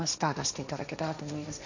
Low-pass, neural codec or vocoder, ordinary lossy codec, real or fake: 7.2 kHz; codec, 16 kHz, 1.1 kbps, Voila-Tokenizer; none; fake